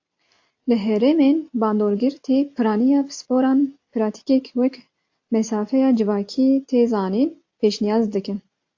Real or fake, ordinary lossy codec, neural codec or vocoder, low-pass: real; AAC, 48 kbps; none; 7.2 kHz